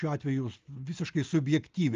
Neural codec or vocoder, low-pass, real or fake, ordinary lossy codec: none; 7.2 kHz; real; Opus, 24 kbps